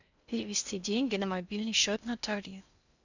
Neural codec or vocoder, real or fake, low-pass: codec, 16 kHz in and 24 kHz out, 0.6 kbps, FocalCodec, streaming, 4096 codes; fake; 7.2 kHz